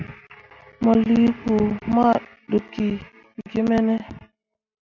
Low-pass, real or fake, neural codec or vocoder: 7.2 kHz; real; none